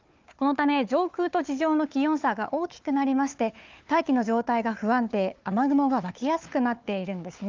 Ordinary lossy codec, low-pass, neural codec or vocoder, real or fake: Opus, 24 kbps; 7.2 kHz; codec, 16 kHz, 4 kbps, FunCodec, trained on Chinese and English, 50 frames a second; fake